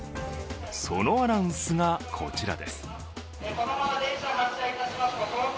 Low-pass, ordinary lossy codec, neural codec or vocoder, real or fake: none; none; none; real